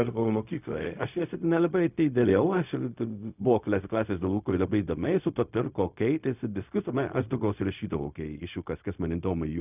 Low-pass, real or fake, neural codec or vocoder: 3.6 kHz; fake; codec, 16 kHz, 0.4 kbps, LongCat-Audio-Codec